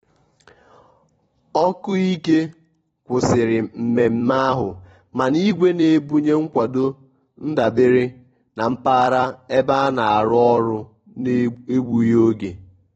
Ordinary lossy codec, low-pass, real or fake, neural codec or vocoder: AAC, 24 kbps; 19.8 kHz; fake; vocoder, 44.1 kHz, 128 mel bands every 256 samples, BigVGAN v2